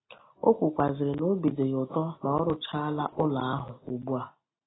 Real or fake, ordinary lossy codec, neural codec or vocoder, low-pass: real; AAC, 16 kbps; none; 7.2 kHz